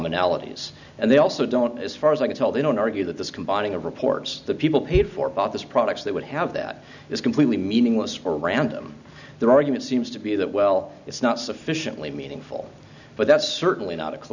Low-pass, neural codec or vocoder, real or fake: 7.2 kHz; none; real